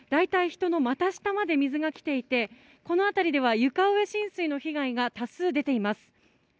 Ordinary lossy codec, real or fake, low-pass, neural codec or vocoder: none; real; none; none